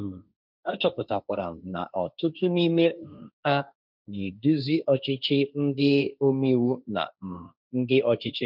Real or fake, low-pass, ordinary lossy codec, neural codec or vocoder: fake; 5.4 kHz; none; codec, 16 kHz, 1.1 kbps, Voila-Tokenizer